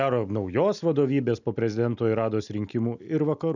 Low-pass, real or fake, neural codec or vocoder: 7.2 kHz; real; none